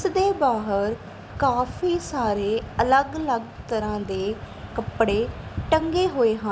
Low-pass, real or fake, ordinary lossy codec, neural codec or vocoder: none; real; none; none